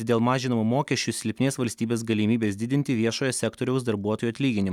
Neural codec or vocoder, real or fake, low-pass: none; real; 19.8 kHz